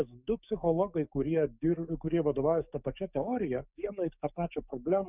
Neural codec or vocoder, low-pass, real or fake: codec, 44.1 kHz, 7.8 kbps, DAC; 3.6 kHz; fake